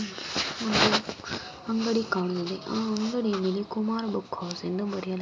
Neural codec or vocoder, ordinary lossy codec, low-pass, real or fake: none; none; none; real